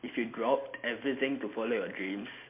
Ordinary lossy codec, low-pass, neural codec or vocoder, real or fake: MP3, 24 kbps; 3.6 kHz; none; real